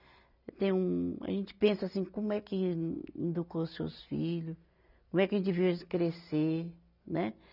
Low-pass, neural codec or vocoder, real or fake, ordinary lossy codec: 5.4 kHz; none; real; none